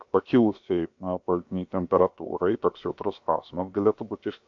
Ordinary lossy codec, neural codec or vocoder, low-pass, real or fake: MP3, 48 kbps; codec, 16 kHz, about 1 kbps, DyCAST, with the encoder's durations; 7.2 kHz; fake